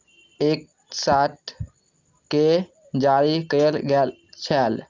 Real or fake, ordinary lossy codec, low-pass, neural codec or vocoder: real; Opus, 24 kbps; 7.2 kHz; none